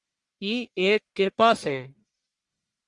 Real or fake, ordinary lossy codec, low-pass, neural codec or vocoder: fake; Opus, 64 kbps; 10.8 kHz; codec, 44.1 kHz, 1.7 kbps, Pupu-Codec